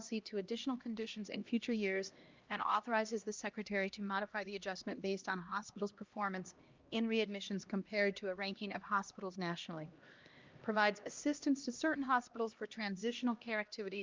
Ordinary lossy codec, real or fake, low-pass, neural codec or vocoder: Opus, 24 kbps; fake; 7.2 kHz; codec, 16 kHz, 1 kbps, X-Codec, HuBERT features, trained on LibriSpeech